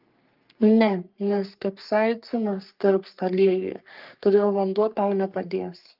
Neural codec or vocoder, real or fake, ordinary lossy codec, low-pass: codec, 44.1 kHz, 3.4 kbps, Pupu-Codec; fake; Opus, 24 kbps; 5.4 kHz